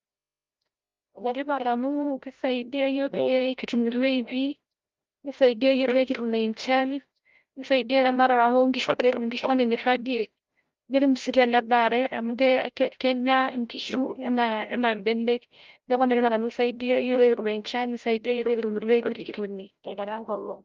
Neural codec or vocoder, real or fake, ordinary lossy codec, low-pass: codec, 16 kHz, 0.5 kbps, FreqCodec, larger model; fake; Opus, 32 kbps; 7.2 kHz